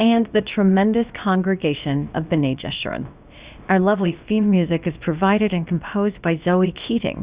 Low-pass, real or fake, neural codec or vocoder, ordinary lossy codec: 3.6 kHz; fake; codec, 16 kHz, about 1 kbps, DyCAST, with the encoder's durations; Opus, 64 kbps